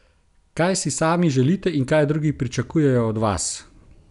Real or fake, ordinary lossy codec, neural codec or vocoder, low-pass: real; none; none; 10.8 kHz